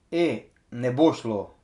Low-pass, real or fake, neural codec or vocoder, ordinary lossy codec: 10.8 kHz; real; none; none